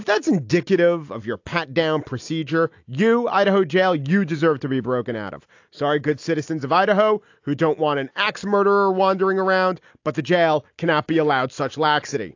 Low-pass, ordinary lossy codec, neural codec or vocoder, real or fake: 7.2 kHz; AAC, 48 kbps; none; real